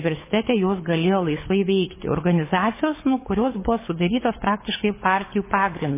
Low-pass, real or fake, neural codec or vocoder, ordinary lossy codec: 3.6 kHz; fake; codec, 24 kHz, 6 kbps, HILCodec; MP3, 16 kbps